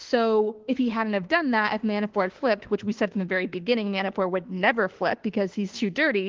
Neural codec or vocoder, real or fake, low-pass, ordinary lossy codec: autoencoder, 48 kHz, 32 numbers a frame, DAC-VAE, trained on Japanese speech; fake; 7.2 kHz; Opus, 16 kbps